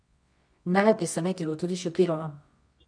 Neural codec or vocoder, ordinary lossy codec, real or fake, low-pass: codec, 24 kHz, 0.9 kbps, WavTokenizer, medium music audio release; none; fake; 9.9 kHz